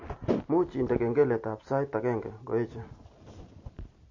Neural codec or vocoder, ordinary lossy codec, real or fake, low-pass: none; MP3, 32 kbps; real; 7.2 kHz